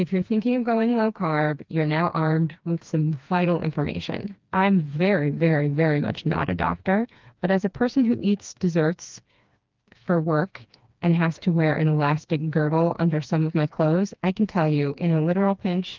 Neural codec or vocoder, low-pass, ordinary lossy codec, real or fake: codec, 16 kHz, 2 kbps, FreqCodec, smaller model; 7.2 kHz; Opus, 24 kbps; fake